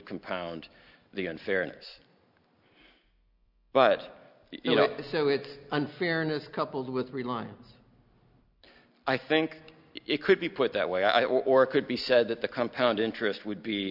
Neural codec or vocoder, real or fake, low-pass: none; real; 5.4 kHz